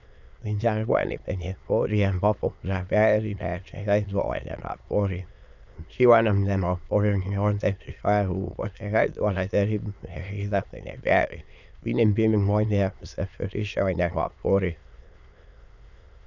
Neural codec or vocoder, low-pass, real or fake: autoencoder, 22.05 kHz, a latent of 192 numbers a frame, VITS, trained on many speakers; 7.2 kHz; fake